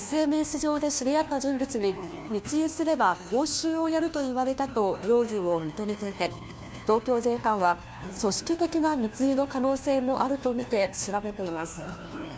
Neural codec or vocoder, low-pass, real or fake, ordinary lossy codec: codec, 16 kHz, 1 kbps, FunCodec, trained on LibriTTS, 50 frames a second; none; fake; none